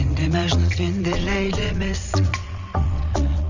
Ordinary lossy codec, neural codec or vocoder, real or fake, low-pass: none; vocoder, 22.05 kHz, 80 mel bands, WaveNeXt; fake; 7.2 kHz